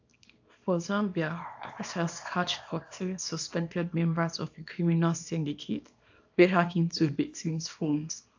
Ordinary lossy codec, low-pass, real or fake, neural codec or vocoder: AAC, 48 kbps; 7.2 kHz; fake; codec, 24 kHz, 0.9 kbps, WavTokenizer, small release